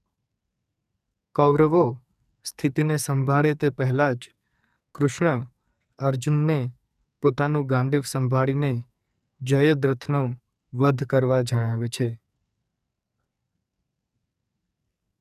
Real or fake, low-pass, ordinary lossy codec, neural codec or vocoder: fake; 14.4 kHz; none; codec, 44.1 kHz, 2.6 kbps, SNAC